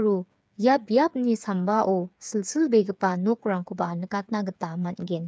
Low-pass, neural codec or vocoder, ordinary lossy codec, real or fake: none; codec, 16 kHz, 8 kbps, FreqCodec, smaller model; none; fake